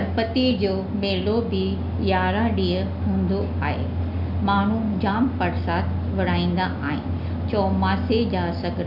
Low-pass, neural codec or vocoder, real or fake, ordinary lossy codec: 5.4 kHz; none; real; none